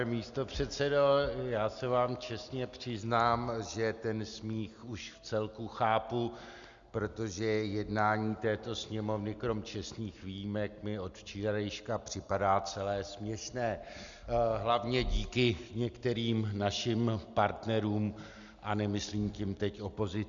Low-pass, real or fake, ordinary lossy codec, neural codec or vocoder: 7.2 kHz; real; Opus, 64 kbps; none